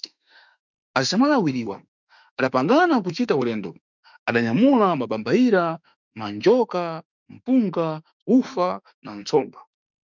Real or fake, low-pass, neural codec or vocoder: fake; 7.2 kHz; autoencoder, 48 kHz, 32 numbers a frame, DAC-VAE, trained on Japanese speech